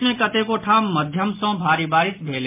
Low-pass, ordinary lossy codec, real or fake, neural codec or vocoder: 3.6 kHz; MP3, 32 kbps; real; none